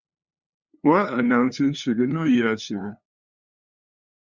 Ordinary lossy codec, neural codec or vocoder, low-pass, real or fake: Opus, 64 kbps; codec, 16 kHz, 2 kbps, FunCodec, trained on LibriTTS, 25 frames a second; 7.2 kHz; fake